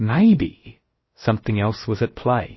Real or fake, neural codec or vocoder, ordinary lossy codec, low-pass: fake; codec, 16 kHz, about 1 kbps, DyCAST, with the encoder's durations; MP3, 24 kbps; 7.2 kHz